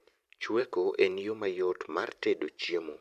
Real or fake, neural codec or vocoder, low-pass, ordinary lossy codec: fake; vocoder, 44.1 kHz, 128 mel bands every 512 samples, BigVGAN v2; 14.4 kHz; none